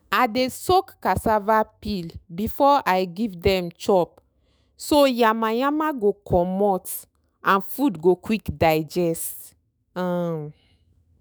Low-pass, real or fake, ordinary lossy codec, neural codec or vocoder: none; fake; none; autoencoder, 48 kHz, 128 numbers a frame, DAC-VAE, trained on Japanese speech